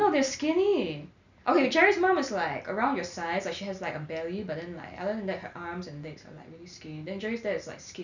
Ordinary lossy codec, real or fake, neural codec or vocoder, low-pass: none; real; none; 7.2 kHz